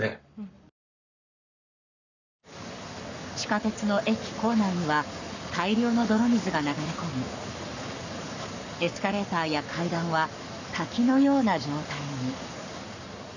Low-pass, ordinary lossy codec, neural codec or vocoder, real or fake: 7.2 kHz; none; codec, 44.1 kHz, 7.8 kbps, Pupu-Codec; fake